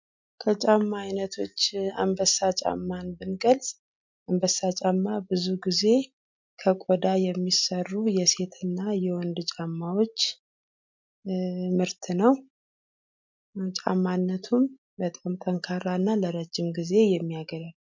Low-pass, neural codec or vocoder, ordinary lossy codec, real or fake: 7.2 kHz; none; MP3, 64 kbps; real